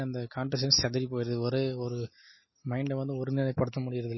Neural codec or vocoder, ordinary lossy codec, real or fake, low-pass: none; MP3, 24 kbps; real; 7.2 kHz